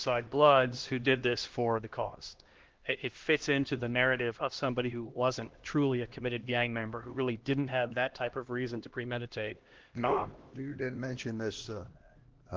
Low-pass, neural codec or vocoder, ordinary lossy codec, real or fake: 7.2 kHz; codec, 16 kHz, 1 kbps, X-Codec, HuBERT features, trained on LibriSpeech; Opus, 16 kbps; fake